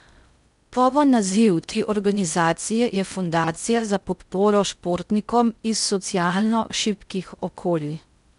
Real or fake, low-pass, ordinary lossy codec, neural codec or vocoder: fake; 10.8 kHz; none; codec, 16 kHz in and 24 kHz out, 0.6 kbps, FocalCodec, streaming, 4096 codes